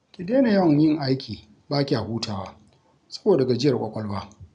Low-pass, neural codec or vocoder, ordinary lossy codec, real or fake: 9.9 kHz; none; none; real